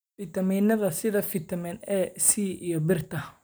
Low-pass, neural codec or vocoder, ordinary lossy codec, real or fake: none; none; none; real